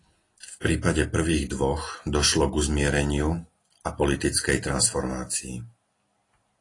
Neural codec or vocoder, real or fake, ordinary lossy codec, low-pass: none; real; AAC, 32 kbps; 10.8 kHz